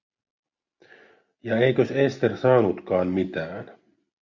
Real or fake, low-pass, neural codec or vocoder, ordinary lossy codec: real; 7.2 kHz; none; Opus, 64 kbps